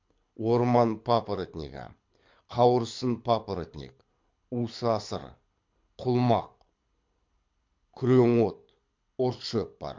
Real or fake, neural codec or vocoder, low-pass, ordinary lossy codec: fake; codec, 24 kHz, 6 kbps, HILCodec; 7.2 kHz; MP3, 48 kbps